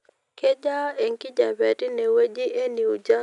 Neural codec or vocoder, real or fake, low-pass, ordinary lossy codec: none; real; 10.8 kHz; none